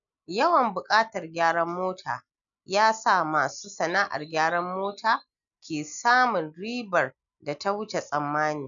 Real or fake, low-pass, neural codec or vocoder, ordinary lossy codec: real; 7.2 kHz; none; none